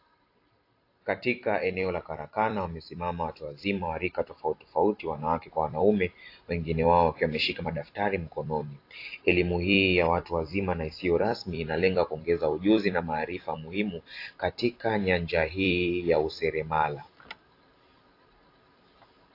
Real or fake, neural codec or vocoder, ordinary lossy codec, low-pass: fake; vocoder, 44.1 kHz, 128 mel bands every 256 samples, BigVGAN v2; AAC, 32 kbps; 5.4 kHz